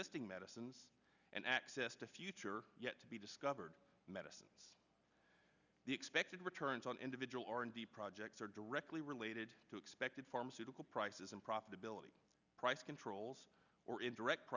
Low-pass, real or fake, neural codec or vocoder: 7.2 kHz; real; none